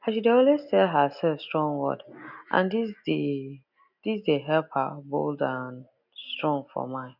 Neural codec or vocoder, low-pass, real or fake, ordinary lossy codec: none; 5.4 kHz; real; none